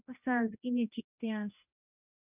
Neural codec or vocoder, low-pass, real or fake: codec, 16 kHz, 1 kbps, X-Codec, HuBERT features, trained on general audio; 3.6 kHz; fake